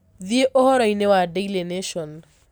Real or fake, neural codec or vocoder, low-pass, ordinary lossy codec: real; none; none; none